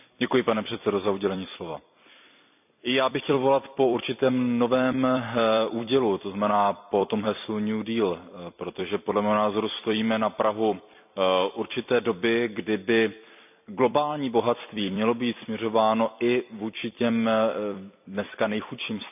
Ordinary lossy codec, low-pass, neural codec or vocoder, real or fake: none; 3.6 kHz; vocoder, 44.1 kHz, 128 mel bands every 256 samples, BigVGAN v2; fake